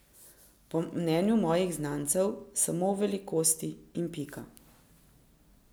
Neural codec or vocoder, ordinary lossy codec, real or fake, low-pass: none; none; real; none